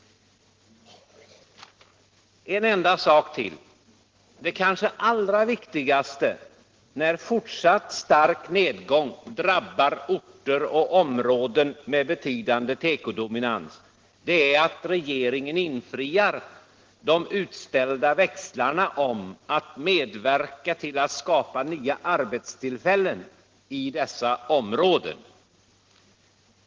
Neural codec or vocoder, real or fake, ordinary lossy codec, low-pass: none; real; Opus, 16 kbps; 7.2 kHz